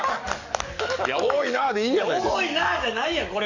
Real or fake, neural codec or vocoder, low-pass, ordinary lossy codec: fake; codec, 44.1 kHz, 7.8 kbps, DAC; 7.2 kHz; none